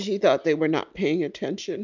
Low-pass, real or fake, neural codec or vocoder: 7.2 kHz; real; none